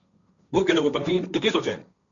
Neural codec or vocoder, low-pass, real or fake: codec, 16 kHz, 1.1 kbps, Voila-Tokenizer; 7.2 kHz; fake